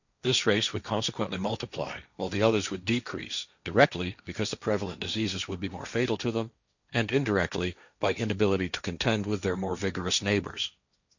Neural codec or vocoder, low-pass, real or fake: codec, 16 kHz, 1.1 kbps, Voila-Tokenizer; 7.2 kHz; fake